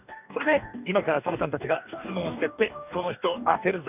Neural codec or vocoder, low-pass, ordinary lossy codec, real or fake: codec, 44.1 kHz, 2.6 kbps, DAC; 3.6 kHz; none; fake